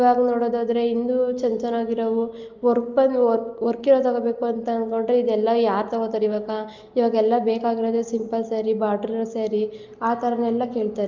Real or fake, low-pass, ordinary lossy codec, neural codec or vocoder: real; 7.2 kHz; Opus, 24 kbps; none